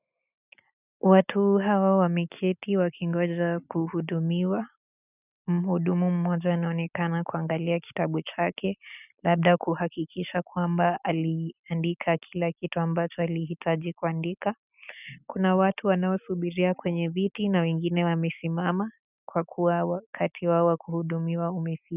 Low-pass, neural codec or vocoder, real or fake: 3.6 kHz; none; real